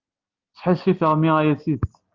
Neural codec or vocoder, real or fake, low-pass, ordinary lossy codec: none; real; 7.2 kHz; Opus, 24 kbps